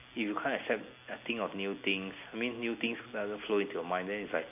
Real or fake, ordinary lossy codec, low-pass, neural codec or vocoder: real; AAC, 32 kbps; 3.6 kHz; none